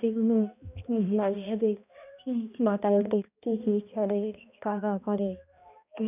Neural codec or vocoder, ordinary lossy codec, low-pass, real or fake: codec, 16 kHz, 1 kbps, X-Codec, HuBERT features, trained on balanced general audio; none; 3.6 kHz; fake